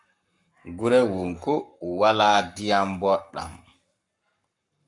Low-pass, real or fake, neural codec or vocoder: 10.8 kHz; fake; codec, 44.1 kHz, 7.8 kbps, Pupu-Codec